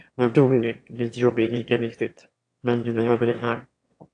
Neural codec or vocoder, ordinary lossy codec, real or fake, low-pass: autoencoder, 22.05 kHz, a latent of 192 numbers a frame, VITS, trained on one speaker; AAC, 48 kbps; fake; 9.9 kHz